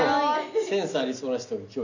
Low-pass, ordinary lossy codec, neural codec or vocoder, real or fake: 7.2 kHz; none; none; real